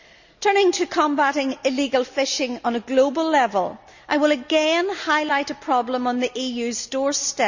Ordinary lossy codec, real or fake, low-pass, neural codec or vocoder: none; real; 7.2 kHz; none